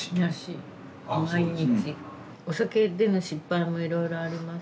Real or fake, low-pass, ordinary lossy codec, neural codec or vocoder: real; none; none; none